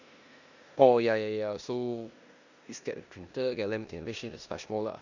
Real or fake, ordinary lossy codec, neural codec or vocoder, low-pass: fake; none; codec, 16 kHz in and 24 kHz out, 0.9 kbps, LongCat-Audio-Codec, four codebook decoder; 7.2 kHz